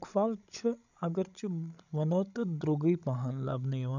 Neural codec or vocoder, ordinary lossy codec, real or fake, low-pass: codec, 16 kHz, 16 kbps, FreqCodec, larger model; none; fake; 7.2 kHz